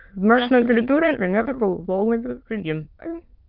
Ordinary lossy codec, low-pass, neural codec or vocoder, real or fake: Opus, 32 kbps; 5.4 kHz; autoencoder, 22.05 kHz, a latent of 192 numbers a frame, VITS, trained on many speakers; fake